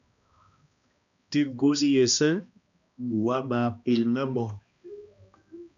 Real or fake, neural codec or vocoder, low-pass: fake; codec, 16 kHz, 1 kbps, X-Codec, HuBERT features, trained on balanced general audio; 7.2 kHz